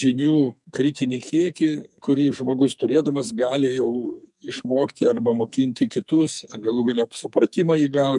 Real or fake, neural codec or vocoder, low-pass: fake; codec, 44.1 kHz, 2.6 kbps, SNAC; 10.8 kHz